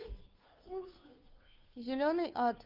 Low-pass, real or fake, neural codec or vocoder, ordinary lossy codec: 5.4 kHz; fake; codec, 16 kHz, 2 kbps, FunCodec, trained on Chinese and English, 25 frames a second; Opus, 24 kbps